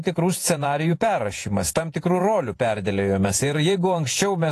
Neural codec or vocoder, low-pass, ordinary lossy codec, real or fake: vocoder, 48 kHz, 128 mel bands, Vocos; 14.4 kHz; AAC, 48 kbps; fake